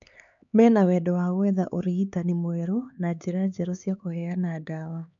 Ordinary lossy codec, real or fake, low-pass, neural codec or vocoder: MP3, 96 kbps; fake; 7.2 kHz; codec, 16 kHz, 4 kbps, X-Codec, HuBERT features, trained on LibriSpeech